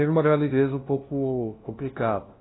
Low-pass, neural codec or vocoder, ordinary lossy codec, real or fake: 7.2 kHz; codec, 16 kHz, 0.5 kbps, FunCodec, trained on LibriTTS, 25 frames a second; AAC, 16 kbps; fake